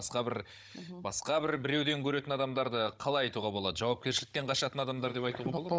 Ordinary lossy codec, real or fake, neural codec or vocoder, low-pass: none; fake; codec, 16 kHz, 16 kbps, FunCodec, trained on Chinese and English, 50 frames a second; none